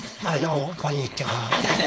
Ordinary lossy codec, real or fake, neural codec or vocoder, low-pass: none; fake; codec, 16 kHz, 4.8 kbps, FACodec; none